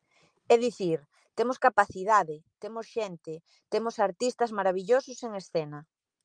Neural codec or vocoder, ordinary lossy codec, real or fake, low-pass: none; Opus, 32 kbps; real; 9.9 kHz